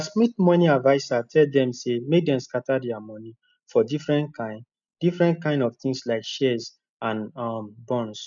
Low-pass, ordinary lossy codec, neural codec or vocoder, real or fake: 7.2 kHz; none; none; real